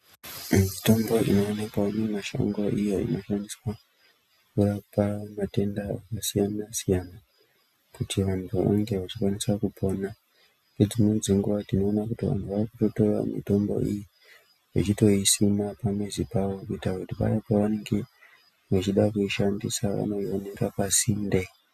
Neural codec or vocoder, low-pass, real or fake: none; 14.4 kHz; real